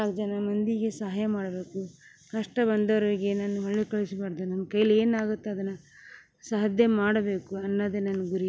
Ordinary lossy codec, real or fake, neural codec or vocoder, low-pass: none; real; none; none